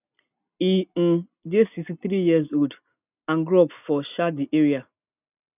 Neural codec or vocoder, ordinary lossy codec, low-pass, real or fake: none; none; 3.6 kHz; real